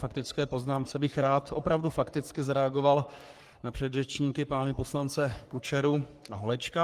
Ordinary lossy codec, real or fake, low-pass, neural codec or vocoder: Opus, 24 kbps; fake; 14.4 kHz; codec, 44.1 kHz, 3.4 kbps, Pupu-Codec